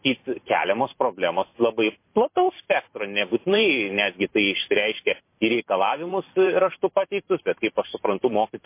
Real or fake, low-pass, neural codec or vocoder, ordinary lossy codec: fake; 3.6 kHz; vocoder, 44.1 kHz, 128 mel bands every 512 samples, BigVGAN v2; MP3, 24 kbps